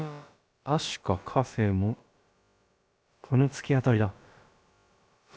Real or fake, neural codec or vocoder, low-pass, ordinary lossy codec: fake; codec, 16 kHz, about 1 kbps, DyCAST, with the encoder's durations; none; none